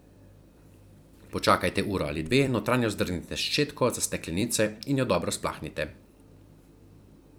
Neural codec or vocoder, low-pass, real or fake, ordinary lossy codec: vocoder, 44.1 kHz, 128 mel bands every 256 samples, BigVGAN v2; none; fake; none